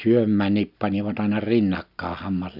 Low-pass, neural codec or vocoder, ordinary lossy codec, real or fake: 5.4 kHz; none; none; real